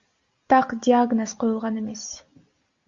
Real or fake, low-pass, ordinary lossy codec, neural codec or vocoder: real; 7.2 kHz; Opus, 64 kbps; none